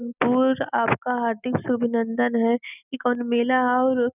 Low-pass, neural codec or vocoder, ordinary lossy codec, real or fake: 3.6 kHz; none; none; real